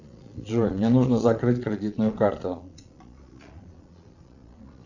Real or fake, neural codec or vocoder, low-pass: fake; vocoder, 22.05 kHz, 80 mel bands, Vocos; 7.2 kHz